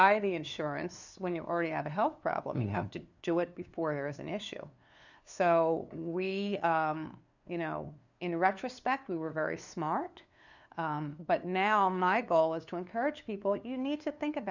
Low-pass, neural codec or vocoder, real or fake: 7.2 kHz; codec, 16 kHz, 2 kbps, FunCodec, trained on LibriTTS, 25 frames a second; fake